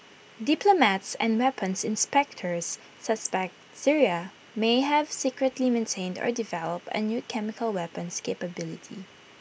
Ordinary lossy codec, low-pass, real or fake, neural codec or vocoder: none; none; real; none